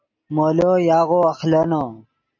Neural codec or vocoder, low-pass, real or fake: none; 7.2 kHz; real